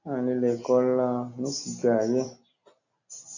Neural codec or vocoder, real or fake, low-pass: none; real; 7.2 kHz